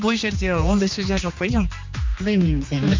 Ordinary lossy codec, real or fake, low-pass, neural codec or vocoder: MP3, 64 kbps; fake; 7.2 kHz; codec, 16 kHz, 2 kbps, X-Codec, HuBERT features, trained on general audio